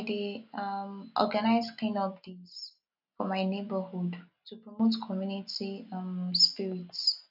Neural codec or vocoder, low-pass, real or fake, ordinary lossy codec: none; 5.4 kHz; real; none